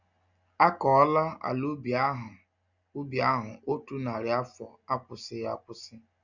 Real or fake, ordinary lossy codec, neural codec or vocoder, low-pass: real; none; none; 7.2 kHz